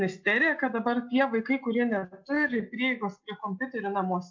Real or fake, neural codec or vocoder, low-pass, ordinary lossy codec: real; none; 7.2 kHz; MP3, 48 kbps